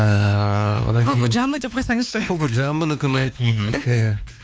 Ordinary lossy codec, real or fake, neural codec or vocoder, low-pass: none; fake; codec, 16 kHz, 2 kbps, X-Codec, WavLM features, trained on Multilingual LibriSpeech; none